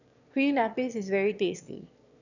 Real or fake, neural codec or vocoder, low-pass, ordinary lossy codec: fake; autoencoder, 22.05 kHz, a latent of 192 numbers a frame, VITS, trained on one speaker; 7.2 kHz; none